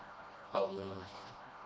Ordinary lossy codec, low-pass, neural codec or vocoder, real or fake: none; none; codec, 16 kHz, 1 kbps, FreqCodec, smaller model; fake